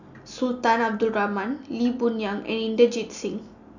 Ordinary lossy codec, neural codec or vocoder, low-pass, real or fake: none; none; 7.2 kHz; real